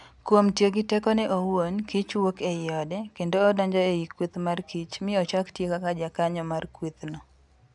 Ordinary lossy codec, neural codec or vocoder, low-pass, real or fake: none; none; 10.8 kHz; real